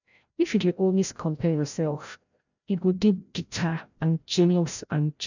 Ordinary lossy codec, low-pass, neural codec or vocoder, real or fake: none; 7.2 kHz; codec, 16 kHz, 0.5 kbps, FreqCodec, larger model; fake